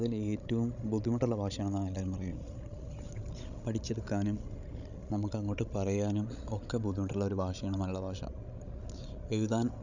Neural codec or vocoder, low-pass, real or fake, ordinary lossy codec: codec, 16 kHz, 16 kbps, FreqCodec, larger model; 7.2 kHz; fake; none